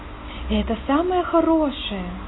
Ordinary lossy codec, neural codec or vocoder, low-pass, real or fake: AAC, 16 kbps; none; 7.2 kHz; real